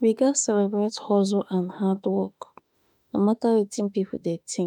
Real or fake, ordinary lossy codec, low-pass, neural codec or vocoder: fake; none; 19.8 kHz; autoencoder, 48 kHz, 32 numbers a frame, DAC-VAE, trained on Japanese speech